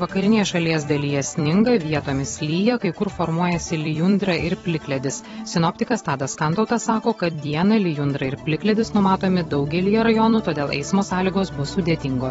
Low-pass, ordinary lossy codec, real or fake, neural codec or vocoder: 19.8 kHz; AAC, 24 kbps; fake; vocoder, 44.1 kHz, 128 mel bands every 256 samples, BigVGAN v2